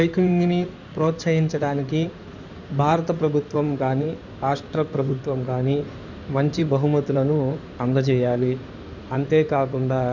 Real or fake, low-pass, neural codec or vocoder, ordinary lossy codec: fake; 7.2 kHz; codec, 16 kHz in and 24 kHz out, 2.2 kbps, FireRedTTS-2 codec; none